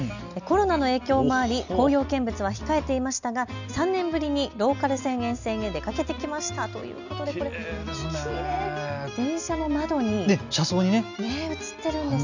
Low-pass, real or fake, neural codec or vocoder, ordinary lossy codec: 7.2 kHz; real; none; none